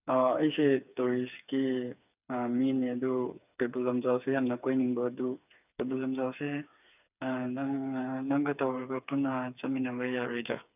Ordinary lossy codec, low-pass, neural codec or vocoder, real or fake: none; 3.6 kHz; codec, 16 kHz, 4 kbps, FreqCodec, smaller model; fake